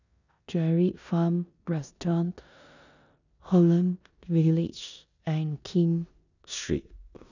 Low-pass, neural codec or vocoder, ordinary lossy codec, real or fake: 7.2 kHz; codec, 16 kHz in and 24 kHz out, 0.9 kbps, LongCat-Audio-Codec, four codebook decoder; none; fake